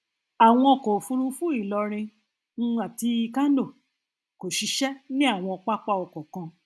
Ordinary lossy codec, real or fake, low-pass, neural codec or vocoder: none; real; none; none